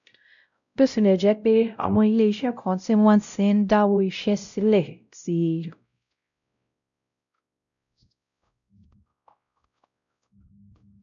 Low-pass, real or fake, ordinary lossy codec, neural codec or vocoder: 7.2 kHz; fake; none; codec, 16 kHz, 0.5 kbps, X-Codec, WavLM features, trained on Multilingual LibriSpeech